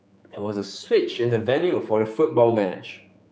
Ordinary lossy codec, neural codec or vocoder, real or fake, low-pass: none; codec, 16 kHz, 4 kbps, X-Codec, HuBERT features, trained on general audio; fake; none